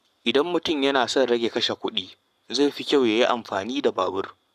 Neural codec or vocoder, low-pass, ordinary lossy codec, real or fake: codec, 44.1 kHz, 7.8 kbps, Pupu-Codec; 14.4 kHz; none; fake